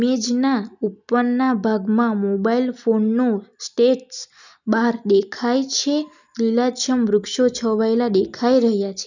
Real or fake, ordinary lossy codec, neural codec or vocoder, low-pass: real; none; none; 7.2 kHz